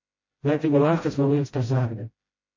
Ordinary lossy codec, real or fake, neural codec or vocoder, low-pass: MP3, 32 kbps; fake; codec, 16 kHz, 0.5 kbps, FreqCodec, smaller model; 7.2 kHz